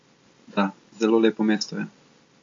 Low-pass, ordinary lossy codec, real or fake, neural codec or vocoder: 7.2 kHz; MP3, 48 kbps; real; none